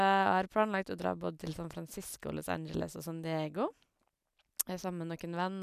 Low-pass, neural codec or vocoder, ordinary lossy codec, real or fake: 14.4 kHz; none; none; real